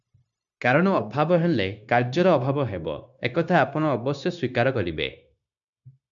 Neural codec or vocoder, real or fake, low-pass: codec, 16 kHz, 0.9 kbps, LongCat-Audio-Codec; fake; 7.2 kHz